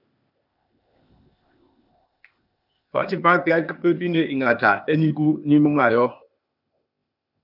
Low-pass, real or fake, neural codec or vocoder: 5.4 kHz; fake; codec, 16 kHz, 0.8 kbps, ZipCodec